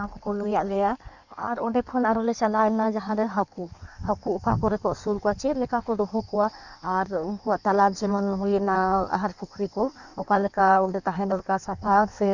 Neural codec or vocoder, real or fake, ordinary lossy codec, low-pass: codec, 16 kHz in and 24 kHz out, 1.1 kbps, FireRedTTS-2 codec; fake; none; 7.2 kHz